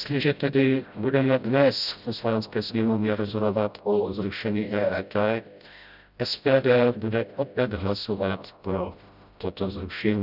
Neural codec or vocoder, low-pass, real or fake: codec, 16 kHz, 0.5 kbps, FreqCodec, smaller model; 5.4 kHz; fake